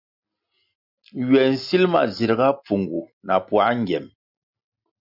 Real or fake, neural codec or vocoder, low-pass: real; none; 5.4 kHz